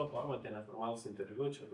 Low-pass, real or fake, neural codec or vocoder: 10.8 kHz; real; none